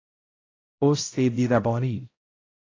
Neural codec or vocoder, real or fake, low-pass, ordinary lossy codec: codec, 16 kHz, 0.5 kbps, X-Codec, HuBERT features, trained on balanced general audio; fake; 7.2 kHz; AAC, 32 kbps